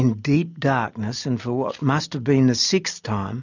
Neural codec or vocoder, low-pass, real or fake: none; 7.2 kHz; real